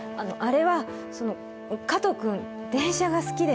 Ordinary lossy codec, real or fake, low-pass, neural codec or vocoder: none; real; none; none